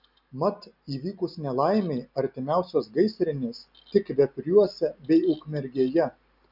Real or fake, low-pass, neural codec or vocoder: real; 5.4 kHz; none